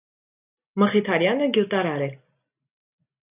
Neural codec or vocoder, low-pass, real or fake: none; 3.6 kHz; real